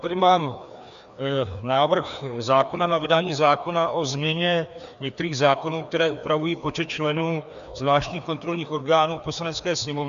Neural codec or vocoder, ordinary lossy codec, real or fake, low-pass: codec, 16 kHz, 2 kbps, FreqCodec, larger model; AAC, 96 kbps; fake; 7.2 kHz